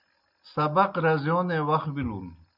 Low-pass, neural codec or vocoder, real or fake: 5.4 kHz; none; real